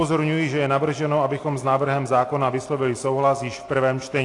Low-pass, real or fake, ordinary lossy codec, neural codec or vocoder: 10.8 kHz; real; AAC, 48 kbps; none